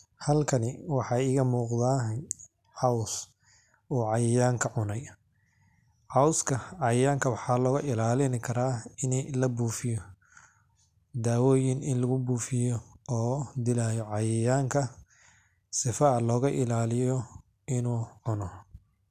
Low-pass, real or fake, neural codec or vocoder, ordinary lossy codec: 14.4 kHz; real; none; none